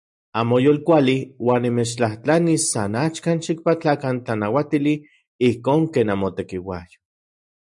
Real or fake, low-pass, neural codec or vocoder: real; 10.8 kHz; none